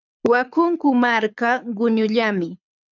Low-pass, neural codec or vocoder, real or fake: 7.2 kHz; codec, 24 kHz, 6 kbps, HILCodec; fake